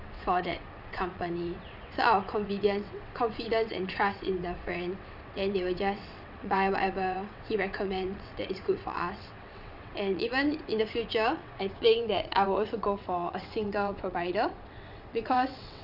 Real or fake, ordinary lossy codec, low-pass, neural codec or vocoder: fake; none; 5.4 kHz; vocoder, 22.05 kHz, 80 mel bands, WaveNeXt